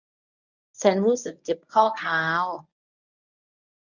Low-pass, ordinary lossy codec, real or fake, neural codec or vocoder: 7.2 kHz; none; fake; codec, 24 kHz, 0.9 kbps, WavTokenizer, medium speech release version 1